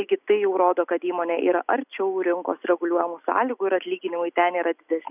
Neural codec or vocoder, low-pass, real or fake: none; 3.6 kHz; real